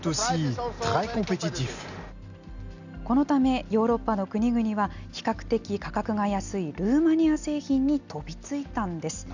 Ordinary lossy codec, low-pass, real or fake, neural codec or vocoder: none; 7.2 kHz; real; none